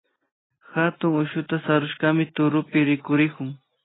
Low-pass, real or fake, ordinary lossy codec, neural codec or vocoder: 7.2 kHz; real; AAC, 16 kbps; none